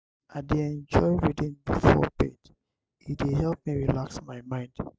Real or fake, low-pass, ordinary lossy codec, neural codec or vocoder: real; none; none; none